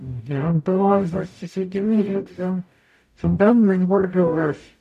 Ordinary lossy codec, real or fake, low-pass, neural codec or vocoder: none; fake; 14.4 kHz; codec, 44.1 kHz, 0.9 kbps, DAC